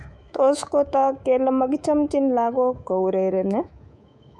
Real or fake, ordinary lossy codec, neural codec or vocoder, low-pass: fake; none; codec, 24 kHz, 3.1 kbps, DualCodec; none